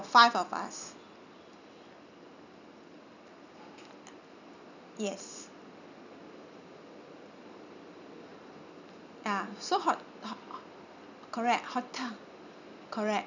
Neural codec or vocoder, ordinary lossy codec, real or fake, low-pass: none; none; real; 7.2 kHz